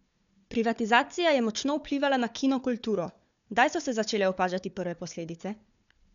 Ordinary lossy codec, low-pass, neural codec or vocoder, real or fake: none; 7.2 kHz; codec, 16 kHz, 4 kbps, FunCodec, trained on Chinese and English, 50 frames a second; fake